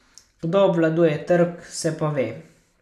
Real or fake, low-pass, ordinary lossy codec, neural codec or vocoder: real; 14.4 kHz; none; none